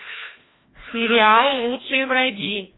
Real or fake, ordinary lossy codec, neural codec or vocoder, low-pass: fake; AAC, 16 kbps; codec, 16 kHz, 1 kbps, FreqCodec, larger model; 7.2 kHz